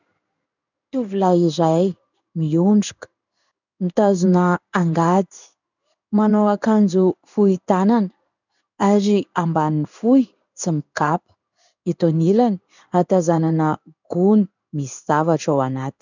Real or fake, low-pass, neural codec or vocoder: fake; 7.2 kHz; codec, 16 kHz in and 24 kHz out, 1 kbps, XY-Tokenizer